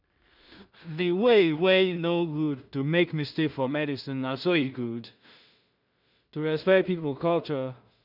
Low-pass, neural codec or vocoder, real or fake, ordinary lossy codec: 5.4 kHz; codec, 16 kHz in and 24 kHz out, 0.4 kbps, LongCat-Audio-Codec, two codebook decoder; fake; none